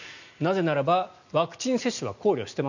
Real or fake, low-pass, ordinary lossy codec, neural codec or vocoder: real; 7.2 kHz; none; none